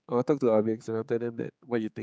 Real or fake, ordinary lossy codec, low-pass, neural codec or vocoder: fake; none; none; codec, 16 kHz, 4 kbps, X-Codec, HuBERT features, trained on general audio